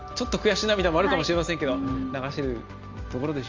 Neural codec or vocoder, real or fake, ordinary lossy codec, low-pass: none; real; Opus, 32 kbps; 7.2 kHz